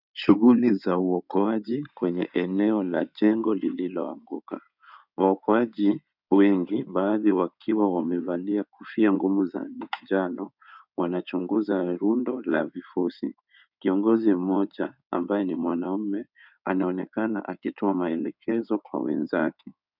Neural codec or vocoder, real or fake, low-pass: codec, 16 kHz in and 24 kHz out, 2.2 kbps, FireRedTTS-2 codec; fake; 5.4 kHz